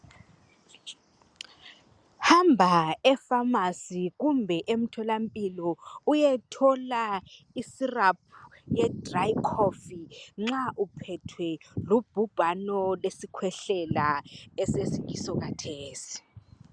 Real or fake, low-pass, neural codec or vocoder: real; 9.9 kHz; none